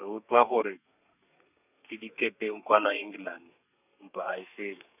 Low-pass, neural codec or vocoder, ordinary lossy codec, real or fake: 3.6 kHz; codec, 32 kHz, 1.9 kbps, SNAC; none; fake